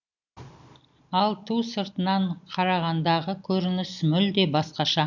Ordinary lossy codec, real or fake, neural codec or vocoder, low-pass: none; real; none; 7.2 kHz